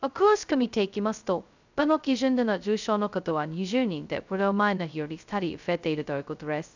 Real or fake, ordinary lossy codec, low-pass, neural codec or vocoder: fake; none; 7.2 kHz; codec, 16 kHz, 0.2 kbps, FocalCodec